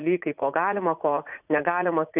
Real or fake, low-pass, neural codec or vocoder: fake; 3.6 kHz; vocoder, 44.1 kHz, 80 mel bands, Vocos